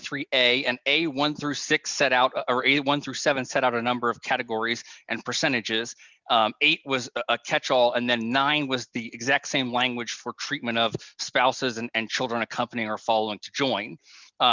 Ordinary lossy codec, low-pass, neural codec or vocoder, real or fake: Opus, 64 kbps; 7.2 kHz; none; real